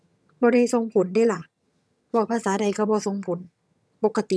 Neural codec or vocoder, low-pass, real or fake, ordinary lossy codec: vocoder, 22.05 kHz, 80 mel bands, HiFi-GAN; none; fake; none